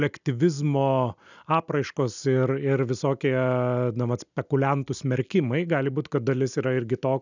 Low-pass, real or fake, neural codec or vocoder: 7.2 kHz; real; none